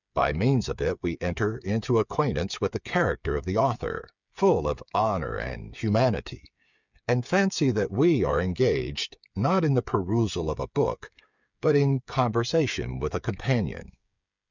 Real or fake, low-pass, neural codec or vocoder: fake; 7.2 kHz; codec, 16 kHz, 8 kbps, FreqCodec, smaller model